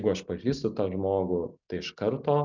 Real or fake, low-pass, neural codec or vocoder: real; 7.2 kHz; none